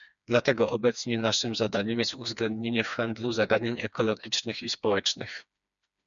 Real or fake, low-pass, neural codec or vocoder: fake; 7.2 kHz; codec, 16 kHz, 2 kbps, FreqCodec, smaller model